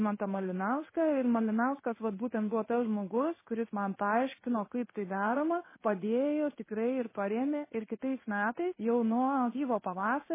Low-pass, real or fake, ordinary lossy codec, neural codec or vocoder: 3.6 kHz; fake; MP3, 16 kbps; codec, 16 kHz in and 24 kHz out, 1 kbps, XY-Tokenizer